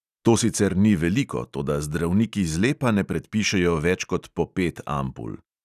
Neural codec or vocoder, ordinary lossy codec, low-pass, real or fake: none; none; 14.4 kHz; real